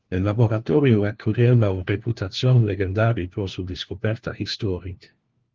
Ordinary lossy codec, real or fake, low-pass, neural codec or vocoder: Opus, 16 kbps; fake; 7.2 kHz; codec, 16 kHz, 1 kbps, FunCodec, trained on LibriTTS, 50 frames a second